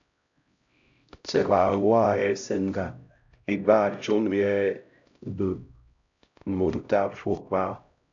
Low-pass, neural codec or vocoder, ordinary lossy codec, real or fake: 7.2 kHz; codec, 16 kHz, 0.5 kbps, X-Codec, HuBERT features, trained on LibriSpeech; AAC, 64 kbps; fake